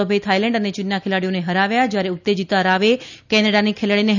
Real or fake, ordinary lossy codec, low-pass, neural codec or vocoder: real; none; 7.2 kHz; none